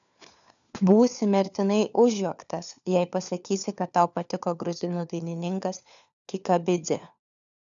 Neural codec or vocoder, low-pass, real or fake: codec, 16 kHz, 4 kbps, FunCodec, trained on LibriTTS, 50 frames a second; 7.2 kHz; fake